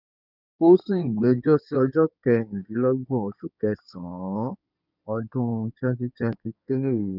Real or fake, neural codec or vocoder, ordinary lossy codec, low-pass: fake; codec, 16 kHz in and 24 kHz out, 2.2 kbps, FireRedTTS-2 codec; none; 5.4 kHz